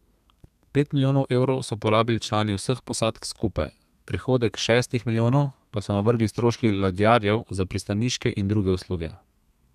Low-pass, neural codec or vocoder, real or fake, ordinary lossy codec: 14.4 kHz; codec, 32 kHz, 1.9 kbps, SNAC; fake; none